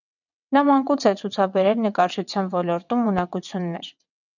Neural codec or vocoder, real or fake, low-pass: vocoder, 22.05 kHz, 80 mel bands, WaveNeXt; fake; 7.2 kHz